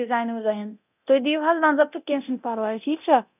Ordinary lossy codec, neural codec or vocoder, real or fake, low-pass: none; codec, 24 kHz, 0.9 kbps, DualCodec; fake; 3.6 kHz